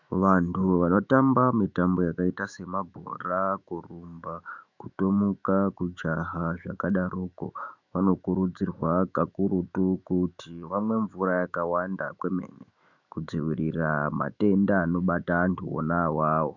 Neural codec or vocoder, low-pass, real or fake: autoencoder, 48 kHz, 128 numbers a frame, DAC-VAE, trained on Japanese speech; 7.2 kHz; fake